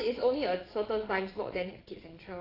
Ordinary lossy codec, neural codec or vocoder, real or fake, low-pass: AAC, 24 kbps; vocoder, 22.05 kHz, 80 mel bands, Vocos; fake; 5.4 kHz